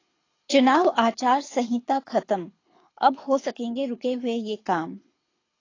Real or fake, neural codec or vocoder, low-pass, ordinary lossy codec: fake; codec, 24 kHz, 6 kbps, HILCodec; 7.2 kHz; AAC, 32 kbps